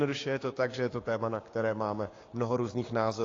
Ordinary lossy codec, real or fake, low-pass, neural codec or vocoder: AAC, 32 kbps; fake; 7.2 kHz; codec, 16 kHz, 6 kbps, DAC